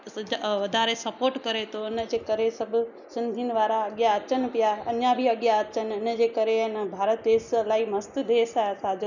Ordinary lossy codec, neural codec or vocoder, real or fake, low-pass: none; none; real; 7.2 kHz